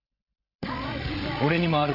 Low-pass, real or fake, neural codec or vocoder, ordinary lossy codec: 5.4 kHz; fake; codec, 16 kHz, 16 kbps, FreqCodec, larger model; none